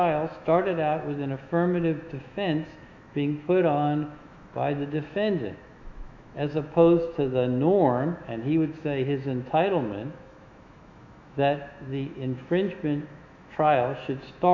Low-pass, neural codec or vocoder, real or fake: 7.2 kHz; autoencoder, 48 kHz, 128 numbers a frame, DAC-VAE, trained on Japanese speech; fake